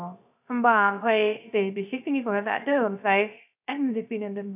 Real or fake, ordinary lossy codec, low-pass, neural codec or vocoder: fake; none; 3.6 kHz; codec, 16 kHz, 0.3 kbps, FocalCodec